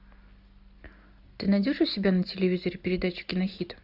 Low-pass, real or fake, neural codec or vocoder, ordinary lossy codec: 5.4 kHz; real; none; AAC, 48 kbps